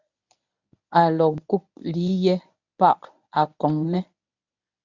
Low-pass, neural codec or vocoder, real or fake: 7.2 kHz; codec, 24 kHz, 0.9 kbps, WavTokenizer, medium speech release version 1; fake